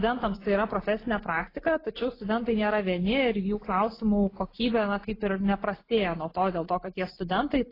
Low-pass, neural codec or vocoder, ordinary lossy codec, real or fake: 5.4 kHz; none; AAC, 24 kbps; real